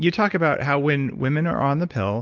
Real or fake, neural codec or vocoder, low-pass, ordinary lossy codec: real; none; 7.2 kHz; Opus, 32 kbps